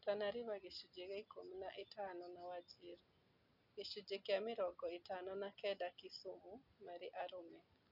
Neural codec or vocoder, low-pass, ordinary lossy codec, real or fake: none; 5.4 kHz; none; real